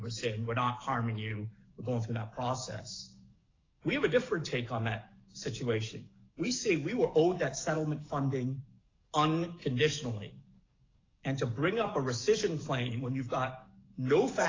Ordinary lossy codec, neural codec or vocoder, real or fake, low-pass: AAC, 32 kbps; codec, 44.1 kHz, 7.8 kbps, DAC; fake; 7.2 kHz